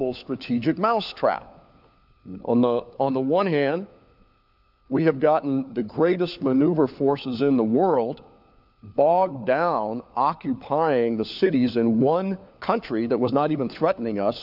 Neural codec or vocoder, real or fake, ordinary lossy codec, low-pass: codec, 16 kHz, 4 kbps, FunCodec, trained on LibriTTS, 50 frames a second; fake; AAC, 48 kbps; 5.4 kHz